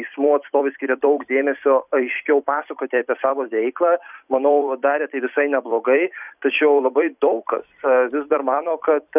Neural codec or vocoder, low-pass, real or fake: vocoder, 44.1 kHz, 128 mel bands every 256 samples, BigVGAN v2; 3.6 kHz; fake